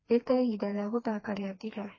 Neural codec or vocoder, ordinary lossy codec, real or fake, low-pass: codec, 16 kHz, 2 kbps, FreqCodec, smaller model; MP3, 32 kbps; fake; 7.2 kHz